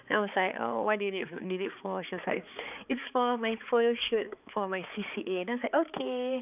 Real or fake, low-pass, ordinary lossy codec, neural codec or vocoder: fake; 3.6 kHz; none; codec, 16 kHz, 4 kbps, X-Codec, HuBERT features, trained on balanced general audio